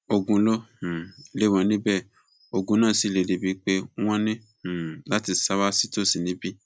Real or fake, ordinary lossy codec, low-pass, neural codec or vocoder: real; none; none; none